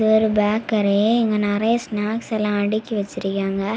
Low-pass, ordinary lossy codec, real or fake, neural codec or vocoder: none; none; real; none